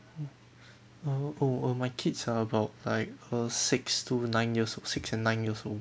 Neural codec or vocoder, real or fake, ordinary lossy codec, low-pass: none; real; none; none